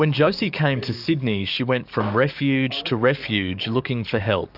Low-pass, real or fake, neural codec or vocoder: 5.4 kHz; fake; codec, 44.1 kHz, 7.8 kbps, DAC